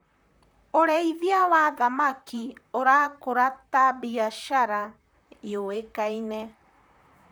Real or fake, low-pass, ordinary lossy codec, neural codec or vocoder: fake; none; none; vocoder, 44.1 kHz, 128 mel bands, Pupu-Vocoder